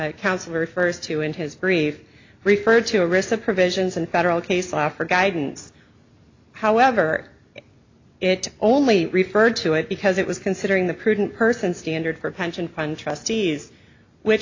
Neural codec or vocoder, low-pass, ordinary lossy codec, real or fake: none; 7.2 kHz; AAC, 48 kbps; real